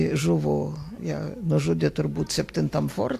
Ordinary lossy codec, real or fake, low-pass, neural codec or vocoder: AAC, 64 kbps; real; 14.4 kHz; none